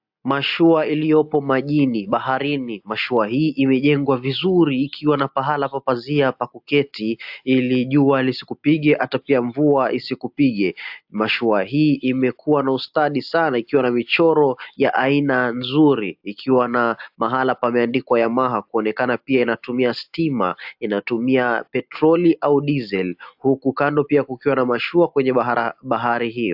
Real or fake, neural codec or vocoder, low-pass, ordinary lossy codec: real; none; 5.4 kHz; AAC, 48 kbps